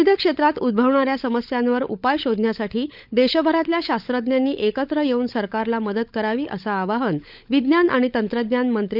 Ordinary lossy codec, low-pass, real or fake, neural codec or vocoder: none; 5.4 kHz; fake; codec, 16 kHz, 16 kbps, FunCodec, trained on LibriTTS, 50 frames a second